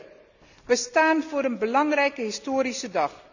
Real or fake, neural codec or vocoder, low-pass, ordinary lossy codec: real; none; 7.2 kHz; none